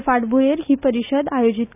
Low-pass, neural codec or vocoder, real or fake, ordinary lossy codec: 3.6 kHz; none; real; none